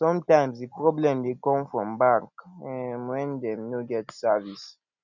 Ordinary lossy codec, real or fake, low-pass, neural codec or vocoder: none; real; 7.2 kHz; none